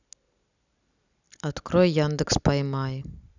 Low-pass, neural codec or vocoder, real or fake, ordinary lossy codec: 7.2 kHz; none; real; none